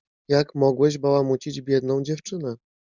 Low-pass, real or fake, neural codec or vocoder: 7.2 kHz; real; none